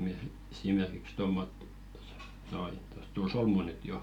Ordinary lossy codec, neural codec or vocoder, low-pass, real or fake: none; none; 19.8 kHz; real